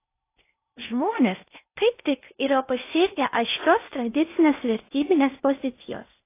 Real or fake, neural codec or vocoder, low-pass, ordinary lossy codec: fake; codec, 16 kHz in and 24 kHz out, 0.6 kbps, FocalCodec, streaming, 4096 codes; 3.6 kHz; AAC, 24 kbps